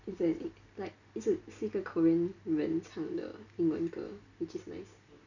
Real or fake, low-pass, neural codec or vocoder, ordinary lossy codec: real; 7.2 kHz; none; none